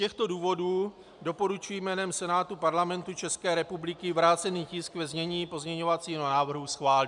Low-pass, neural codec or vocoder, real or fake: 10.8 kHz; none; real